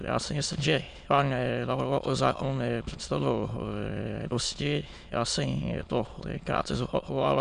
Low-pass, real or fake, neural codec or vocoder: 9.9 kHz; fake; autoencoder, 22.05 kHz, a latent of 192 numbers a frame, VITS, trained on many speakers